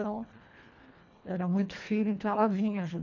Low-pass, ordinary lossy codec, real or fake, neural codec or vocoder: 7.2 kHz; none; fake; codec, 24 kHz, 1.5 kbps, HILCodec